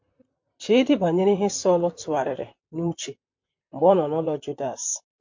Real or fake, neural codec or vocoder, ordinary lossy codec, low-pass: fake; vocoder, 44.1 kHz, 128 mel bands, Pupu-Vocoder; MP3, 48 kbps; 7.2 kHz